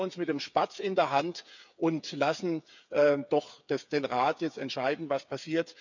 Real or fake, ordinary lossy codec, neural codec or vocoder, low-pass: fake; none; codec, 16 kHz, 8 kbps, FreqCodec, smaller model; 7.2 kHz